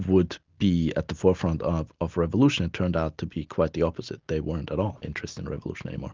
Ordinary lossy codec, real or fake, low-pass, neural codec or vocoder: Opus, 32 kbps; real; 7.2 kHz; none